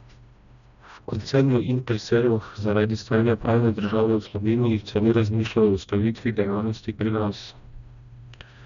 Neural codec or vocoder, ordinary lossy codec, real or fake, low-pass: codec, 16 kHz, 1 kbps, FreqCodec, smaller model; none; fake; 7.2 kHz